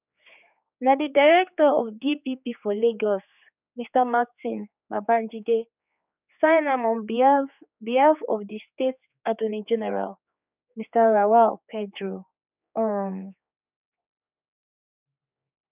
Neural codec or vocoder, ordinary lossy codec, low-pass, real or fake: codec, 16 kHz, 4 kbps, X-Codec, HuBERT features, trained on general audio; none; 3.6 kHz; fake